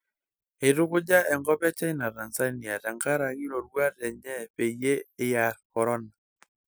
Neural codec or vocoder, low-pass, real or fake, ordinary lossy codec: none; none; real; none